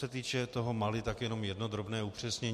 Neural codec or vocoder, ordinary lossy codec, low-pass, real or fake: none; MP3, 64 kbps; 14.4 kHz; real